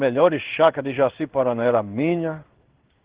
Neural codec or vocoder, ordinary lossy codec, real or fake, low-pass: codec, 16 kHz in and 24 kHz out, 1 kbps, XY-Tokenizer; Opus, 16 kbps; fake; 3.6 kHz